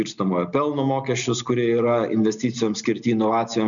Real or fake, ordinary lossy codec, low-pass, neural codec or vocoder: real; MP3, 96 kbps; 7.2 kHz; none